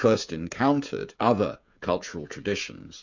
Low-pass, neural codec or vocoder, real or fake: 7.2 kHz; codec, 16 kHz, 16 kbps, FreqCodec, smaller model; fake